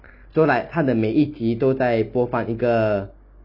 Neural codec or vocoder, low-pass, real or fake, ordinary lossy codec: none; 5.4 kHz; real; none